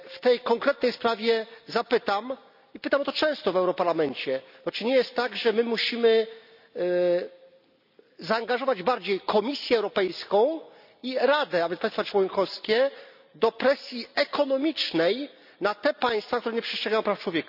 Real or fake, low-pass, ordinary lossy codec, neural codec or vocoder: real; 5.4 kHz; none; none